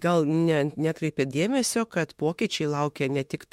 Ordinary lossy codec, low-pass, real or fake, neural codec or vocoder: MP3, 64 kbps; 19.8 kHz; fake; autoencoder, 48 kHz, 32 numbers a frame, DAC-VAE, trained on Japanese speech